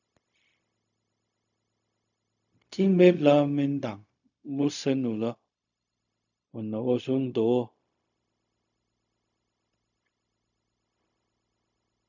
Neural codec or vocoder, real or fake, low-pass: codec, 16 kHz, 0.4 kbps, LongCat-Audio-Codec; fake; 7.2 kHz